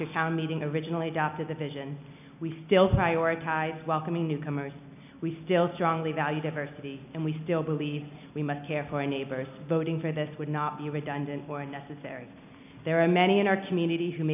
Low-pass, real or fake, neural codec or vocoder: 3.6 kHz; real; none